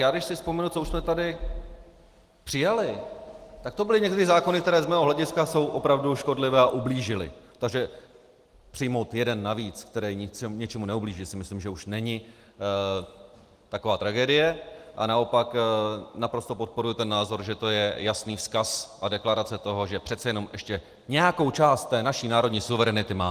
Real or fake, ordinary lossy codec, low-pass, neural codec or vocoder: real; Opus, 24 kbps; 14.4 kHz; none